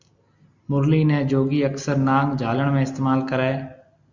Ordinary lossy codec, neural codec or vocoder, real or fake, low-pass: Opus, 64 kbps; none; real; 7.2 kHz